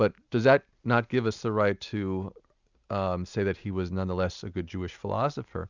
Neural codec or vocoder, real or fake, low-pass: none; real; 7.2 kHz